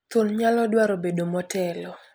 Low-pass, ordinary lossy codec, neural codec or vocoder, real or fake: none; none; none; real